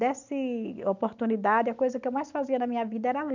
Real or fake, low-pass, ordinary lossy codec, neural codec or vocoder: real; 7.2 kHz; none; none